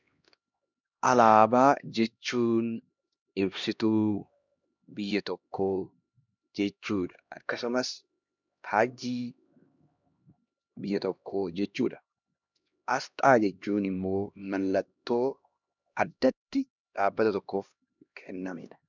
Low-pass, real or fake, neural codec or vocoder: 7.2 kHz; fake; codec, 16 kHz, 1 kbps, X-Codec, HuBERT features, trained on LibriSpeech